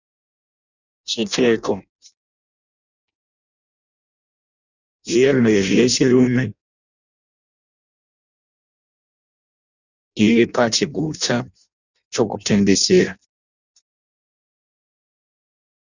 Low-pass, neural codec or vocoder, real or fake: 7.2 kHz; codec, 16 kHz in and 24 kHz out, 0.6 kbps, FireRedTTS-2 codec; fake